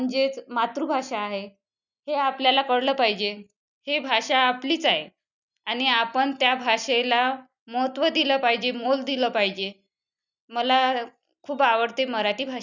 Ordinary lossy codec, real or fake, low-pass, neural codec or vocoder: none; real; 7.2 kHz; none